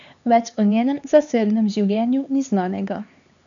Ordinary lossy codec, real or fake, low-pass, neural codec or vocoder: none; fake; 7.2 kHz; codec, 16 kHz, 4 kbps, X-Codec, HuBERT features, trained on LibriSpeech